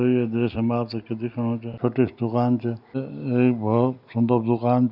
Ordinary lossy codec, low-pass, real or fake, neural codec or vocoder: none; 5.4 kHz; real; none